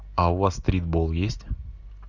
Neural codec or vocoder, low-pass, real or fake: none; 7.2 kHz; real